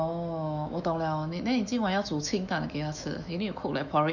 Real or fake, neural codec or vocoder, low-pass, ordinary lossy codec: fake; autoencoder, 48 kHz, 128 numbers a frame, DAC-VAE, trained on Japanese speech; 7.2 kHz; none